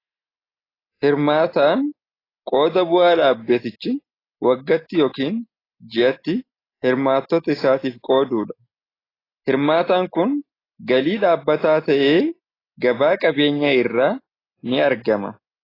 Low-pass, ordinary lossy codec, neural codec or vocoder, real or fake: 5.4 kHz; AAC, 24 kbps; none; real